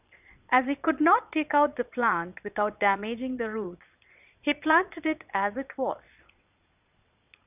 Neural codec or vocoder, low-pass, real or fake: vocoder, 22.05 kHz, 80 mel bands, Vocos; 3.6 kHz; fake